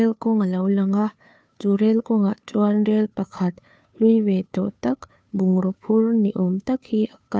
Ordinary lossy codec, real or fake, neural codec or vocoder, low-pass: none; fake; codec, 16 kHz, 2 kbps, FunCodec, trained on Chinese and English, 25 frames a second; none